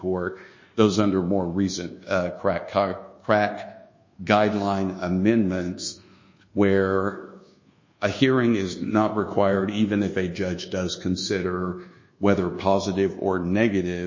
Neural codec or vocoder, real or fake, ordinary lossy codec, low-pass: codec, 24 kHz, 1.2 kbps, DualCodec; fake; MP3, 32 kbps; 7.2 kHz